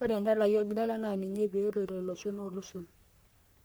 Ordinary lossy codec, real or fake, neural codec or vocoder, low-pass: none; fake; codec, 44.1 kHz, 3.4 kbps, Pupu-Codec; none